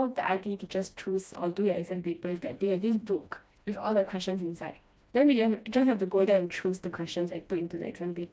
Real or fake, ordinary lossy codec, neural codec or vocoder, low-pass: fake; none; codec, 16 kHz, 1 kbps, FreqCodec, smaller model; none